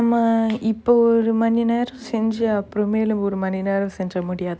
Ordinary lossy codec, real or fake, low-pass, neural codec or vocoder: none; real; none; none